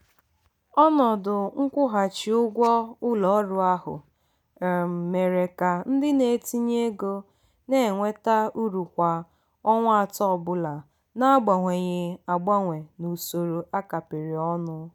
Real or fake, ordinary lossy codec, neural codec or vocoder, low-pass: real; none; none; 19.8 kHz